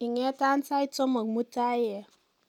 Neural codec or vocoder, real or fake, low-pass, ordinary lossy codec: none; real; 19.8 kHz; none